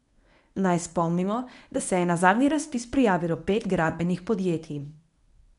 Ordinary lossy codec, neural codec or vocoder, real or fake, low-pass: none; codec, 24 kHz, 0.9 kbps, WavTokenizer, medium speech release version 1; fake; 10.8 kHz